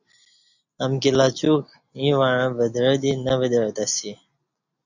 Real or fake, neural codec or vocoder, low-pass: real; none; 7.2 kHz